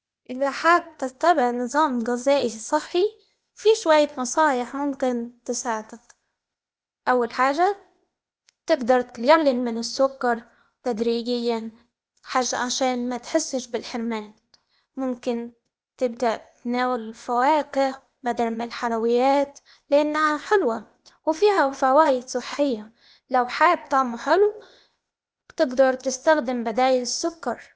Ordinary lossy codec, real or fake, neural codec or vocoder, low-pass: none; fake; codec, 16 kHz, 0.8 kbps, ZipCodec; none